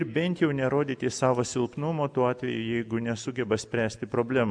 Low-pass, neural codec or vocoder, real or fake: 9.9 kHz; none; real